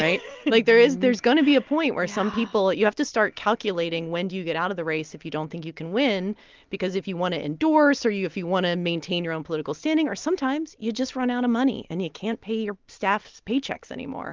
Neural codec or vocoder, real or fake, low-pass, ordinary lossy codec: none; real; 7.2 kHz; Opus, 32 kbps